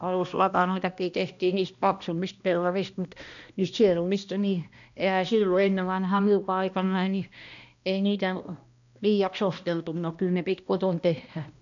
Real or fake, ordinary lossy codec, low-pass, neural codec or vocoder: fake; none; 7.2 kHz; codec, 16 kHz, 1 kbps, X-Codec, HuBERT features, trained on balanced general audio